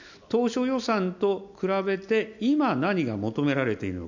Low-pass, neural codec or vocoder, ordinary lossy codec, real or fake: 7.2 kHz; none; none; real